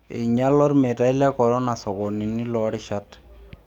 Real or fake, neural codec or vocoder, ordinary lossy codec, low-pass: fake; codec, 44.1 kHz, 7.8 kbps, DAC; none; 19.8 kHz